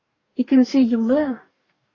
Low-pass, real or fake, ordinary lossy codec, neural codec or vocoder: 7.2 kHz; fake; AAC, 32 kbps; codec, 44.1 kHz, 2.6 kbps, DAC